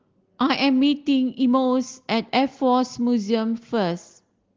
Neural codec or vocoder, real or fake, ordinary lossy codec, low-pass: none; real; Opus, 16 kbps; 7.2 kHz